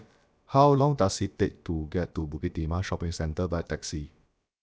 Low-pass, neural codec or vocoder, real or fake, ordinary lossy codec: none; codec, 16 kHz, about 1 kbps, DyCAST, with the encoder's durations; fake; none